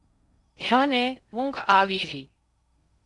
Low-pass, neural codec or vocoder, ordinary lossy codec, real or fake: 10.8 kHz; codec, 16 kHz in and 24 kHz out, 0.6 kbps, FocalCodec, streaming, 4096 codes; Opus, 64 kbps; fake